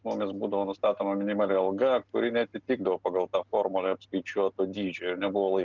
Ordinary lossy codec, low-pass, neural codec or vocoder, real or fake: Opus, 24 kbps; 7.2 kHz; none; real